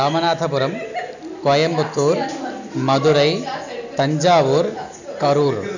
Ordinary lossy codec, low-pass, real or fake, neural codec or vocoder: none; 7.2 kHz; real; none